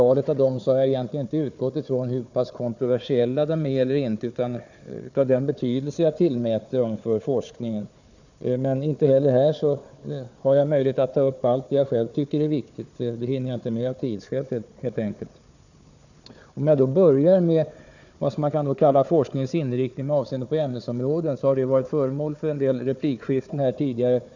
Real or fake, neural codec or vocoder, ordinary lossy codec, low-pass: fake; codec, 16 kHz, 4 kbps, FunCodec, trained on Chinese and English, 50 frames a second; none; 7.2 kHz